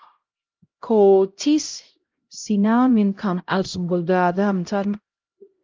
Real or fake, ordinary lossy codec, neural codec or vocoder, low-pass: fake; Opus, 24 kbps; codec, 16 kHz, 0.5 kbps, X-Codec, HuBERT features, trained on LibriSpeech; 7.2 kHz